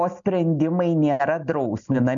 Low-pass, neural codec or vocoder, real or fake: 7.2 kHz; none; real